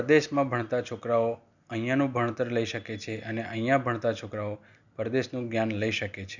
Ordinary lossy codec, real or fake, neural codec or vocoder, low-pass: none; real; none; 7.2 kHz